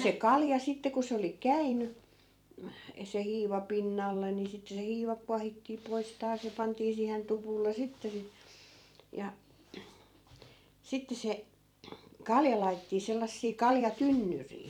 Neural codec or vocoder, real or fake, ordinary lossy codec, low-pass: vocoder, 44.1 kHz, 128 mel bands every 256 samples, BigVGAN v2; fake; none; 19.8 kHz